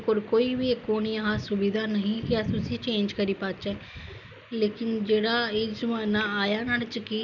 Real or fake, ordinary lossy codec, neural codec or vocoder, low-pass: real; none; none; 7.2 kHz